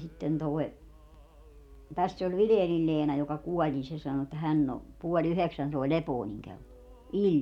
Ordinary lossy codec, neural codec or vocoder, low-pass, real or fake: none; none; 19.8 kHz; real